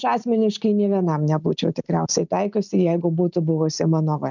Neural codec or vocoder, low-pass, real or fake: none; 7.2 kHz; real